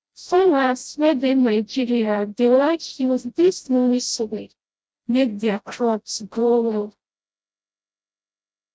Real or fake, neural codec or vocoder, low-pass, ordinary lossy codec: fake; codec, 16 kHz, 0.5 kbps, FreqCodec, smaller model; none; none